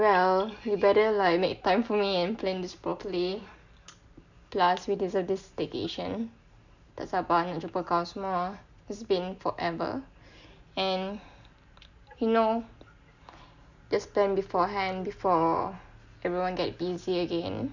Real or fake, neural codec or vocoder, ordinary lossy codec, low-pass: real; none; none; 7.2 kHz